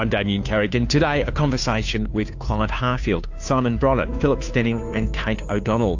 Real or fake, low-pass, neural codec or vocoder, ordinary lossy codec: fake; 7.2 kHz; codec, 16 kHz, 2 kbps, FunCodec, trained on Chinese and English, 25 frames a second; AAC, 48 kbps